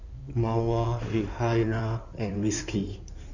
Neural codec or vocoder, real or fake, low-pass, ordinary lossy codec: codec, 16 kHz in and 24 kHz out, 2.2 kbps, FireRedTTS-2 codec; fake; 7.2 kHz; none